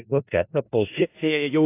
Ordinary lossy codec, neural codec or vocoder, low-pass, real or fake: AAC, 24 kbps; codec, 16 kHz in and 24 kHz out, 0.4 kbps, LongCat-Audio-Codec, four codebook decoder; 3.6 kHz; fake